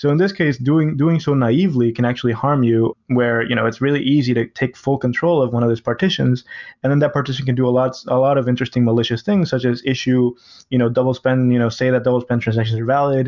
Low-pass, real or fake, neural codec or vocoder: 7.2 kHz; real; none